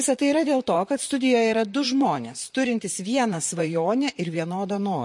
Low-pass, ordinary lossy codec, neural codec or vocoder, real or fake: 10.8 kHz; MP3, 48 kbps; vocoder, 44.1 kHz, 128 mel bands, Pupu-Vocoder; fake